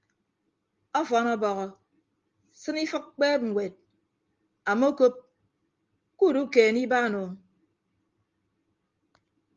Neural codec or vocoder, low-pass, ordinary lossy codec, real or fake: none; 7.2 kHz; Opus, 32 kbps; real